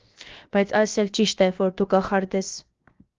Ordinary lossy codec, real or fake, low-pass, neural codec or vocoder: Opus, 32 kbps; fake; 7.2 kHz; codec, 16 kHz, 0.7 kbps, FocalCodec